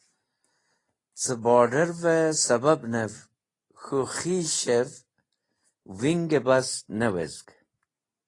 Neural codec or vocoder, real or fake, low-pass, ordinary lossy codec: none; real; 10.8 kHz; AAC, 32 kbps